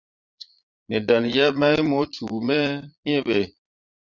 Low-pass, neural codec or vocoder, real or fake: 7.2 kHz; vocoder, 22.05 kHz, 80 mel bands, Vocos; fake